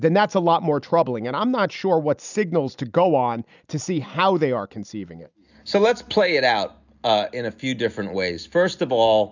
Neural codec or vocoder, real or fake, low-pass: none; real; 7.2 kHz